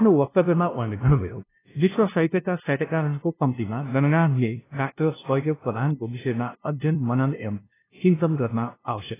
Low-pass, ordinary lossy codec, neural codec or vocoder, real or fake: 3.6 kHz; AAC, 16 kbps; codec, 16 kHz, 0.5 kbps, FunCodec, trained on LibriTTS, 25 frames a second; fake